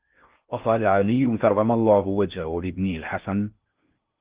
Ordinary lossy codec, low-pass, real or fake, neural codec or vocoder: Opus, 32 kbps; 3.6 kHz; fake; codec, 16 kHz in and 24 kHz out, 0.6 kbps, FocalCodec, streaming, 4096 codes